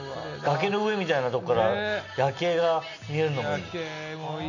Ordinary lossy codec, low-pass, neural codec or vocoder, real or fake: none; 7.2 kHz; none; real